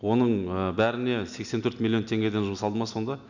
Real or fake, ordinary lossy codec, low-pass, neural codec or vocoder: real; none; 7.2 kHz; none